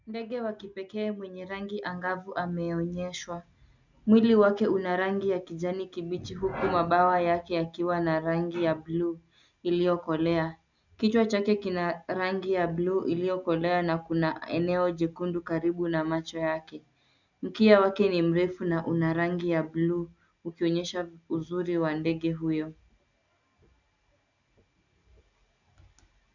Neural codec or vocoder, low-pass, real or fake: none; 7.2 kHz; real